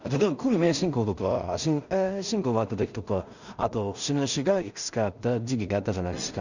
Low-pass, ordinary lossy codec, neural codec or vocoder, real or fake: 7.2 kHz; none; codec, 16 kHz in and 24 kHz out, 0.4 kbps, LongCat-Audio-Codec, two codebook decoder; fake